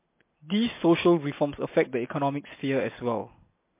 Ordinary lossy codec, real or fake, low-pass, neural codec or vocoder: MP3, 24 kbps; real; 3.6 kHz; none